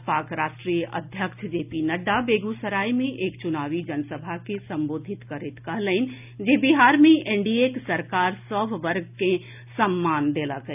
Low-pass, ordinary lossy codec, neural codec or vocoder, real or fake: 3.6 kHz; none; none; real